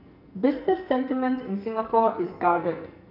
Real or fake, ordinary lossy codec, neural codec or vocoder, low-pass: fake; none; codec, 44.1 kHz, 2.6 kbps, SNAC; 5.4 kHz